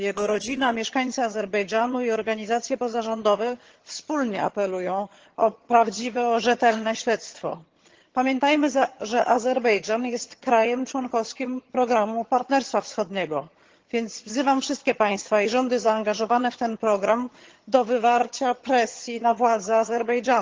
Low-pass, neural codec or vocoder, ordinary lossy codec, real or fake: 7.2 kHz; vocoder, 22.05 kHz, 80 mel bands, HiFi-GAN; Opus, 16 kbps; fake